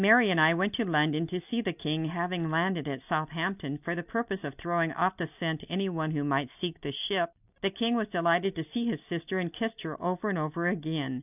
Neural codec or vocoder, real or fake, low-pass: none; real; 3.6 kHz